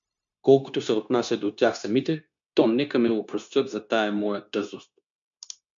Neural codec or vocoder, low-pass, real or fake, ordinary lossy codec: codec, 16 kHz, 0.9 kbps, LongCat-Audio-Codec; 7.2 kHz; fake; MP3, 64 kbps